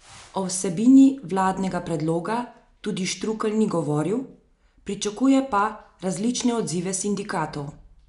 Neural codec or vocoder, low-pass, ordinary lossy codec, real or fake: none; 10.8 kHz; MP3, 96 kbps; real